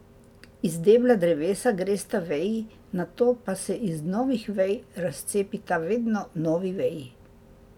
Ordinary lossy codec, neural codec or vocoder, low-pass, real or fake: none; none; 19.8 kHz; real